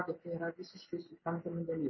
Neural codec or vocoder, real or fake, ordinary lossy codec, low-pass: none; real; MP3, 24 kbps; 7.2 kHz